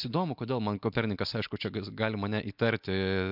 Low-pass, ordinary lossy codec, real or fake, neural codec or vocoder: 5.4 kHz; MP3, 48 kbps; real; none